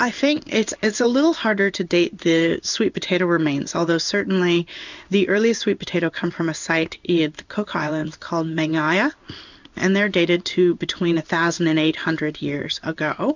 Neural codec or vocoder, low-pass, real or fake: codec, 16 kHz in and 24 kHz out, 1 kbps, XY-Tokenizer; 7.2 kHz; fake